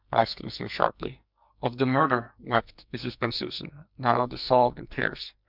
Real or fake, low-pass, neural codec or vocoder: fake; 5.4 kHz; codec, 44.1 kHz, 2.6 kbps, SNAC